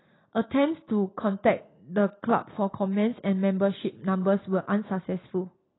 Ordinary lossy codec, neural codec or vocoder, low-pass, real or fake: AAC, 16 kbps; none; 7.2 kHz; real